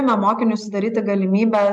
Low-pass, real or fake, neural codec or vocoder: 10.8 kHz; real; none